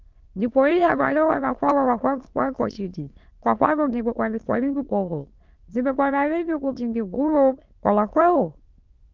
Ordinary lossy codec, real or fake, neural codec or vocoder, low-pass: Opus, 32 kbps; fake; autoencoder, 22.05 kHz, a latent of 192 numbers a frame, VITS, trained on many speakers; 7.2 kHz